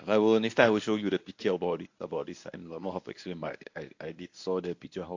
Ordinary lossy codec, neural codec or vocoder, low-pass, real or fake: AAC, 48 kbps; codec, 24 kHz, 0.9 kbps, WavTokenizer, medium speech release version 1; 7.2 kHz; fake